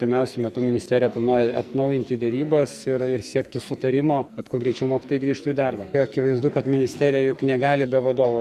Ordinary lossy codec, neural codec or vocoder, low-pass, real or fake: Opus, 64 kbps; codec, 44.1 kHz, 2.6 kbps, SNAC; 14.4 kHz; fake